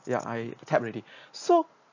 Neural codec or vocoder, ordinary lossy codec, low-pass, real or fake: none; none; 7.2 kHz; real